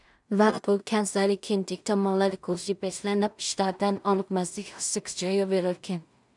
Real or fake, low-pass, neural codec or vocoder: fake; 10.8 kHz; codec, 16 kHz in and 24 kHz out, 0.4 kbps, LongCat-Audio-Codec, two codebook decoder